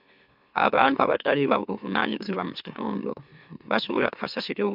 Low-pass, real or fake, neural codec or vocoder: 5.4 kHz; fake; autoencoder, 44.1 kHz, a latent of 192 numbers a frame, MeloTTS